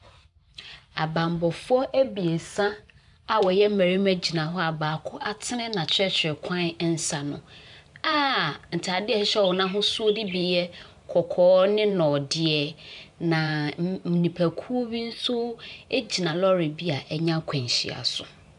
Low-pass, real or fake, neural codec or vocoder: 10.8 kHz; fake; vocoder, 44.1 kHz, 128 mel bands every 512 samples, BigVGAN v2